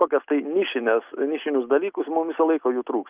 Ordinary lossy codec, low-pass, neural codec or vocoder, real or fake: Opus, 24 kbps; 3.6 kHz; none; real